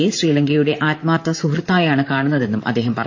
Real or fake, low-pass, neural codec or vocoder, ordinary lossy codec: fake; 7.2 kHz; vocoder, 44.1 kHz, 128 mel bands, Pupu-Vocoder; AAC, 48 kbps